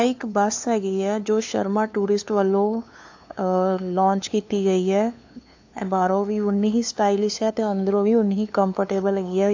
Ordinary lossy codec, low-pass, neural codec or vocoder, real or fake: AAC, 48 kbps; 7.2 kHz; codec, 16 kHz, 2 kbps, FunCodec, trained on LibriTTS, 25 frames a second; fake